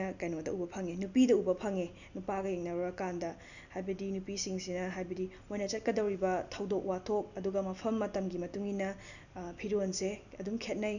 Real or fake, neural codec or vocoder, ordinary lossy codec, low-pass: real; none; none; 7.2 kHz